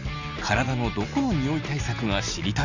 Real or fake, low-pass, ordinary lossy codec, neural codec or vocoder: real; 7.2 kHz; none; none